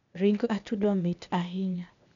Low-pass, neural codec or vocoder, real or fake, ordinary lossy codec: 7.2 kHz; codec, 16 kHz, 0.8 kbps, ZipCodec; fake; none